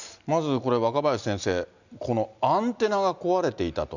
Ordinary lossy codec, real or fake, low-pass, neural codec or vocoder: none; real; 7.2 kHz; none